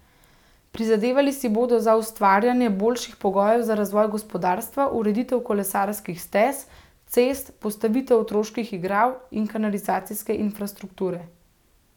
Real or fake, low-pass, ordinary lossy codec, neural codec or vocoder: real; 19.8 kHz; none; none